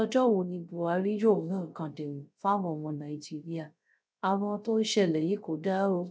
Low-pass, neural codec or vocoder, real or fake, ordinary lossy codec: none; codec, 16 kHz, 0.3 kbps, FocalCodec; fake; none